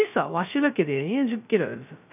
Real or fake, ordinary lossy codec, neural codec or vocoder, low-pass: fake; none; codec, 16 kHz, 0.2 kbps, FocalCodec; 3.6 kHz